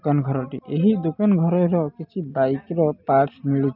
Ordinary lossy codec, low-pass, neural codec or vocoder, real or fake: none; 5.4 kHz; none; real